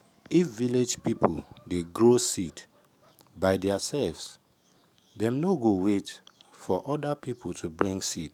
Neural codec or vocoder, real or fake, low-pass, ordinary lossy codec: codec, 44.1 kHz, 7.8 kbps, DAC; fake; 19.8 kHz; none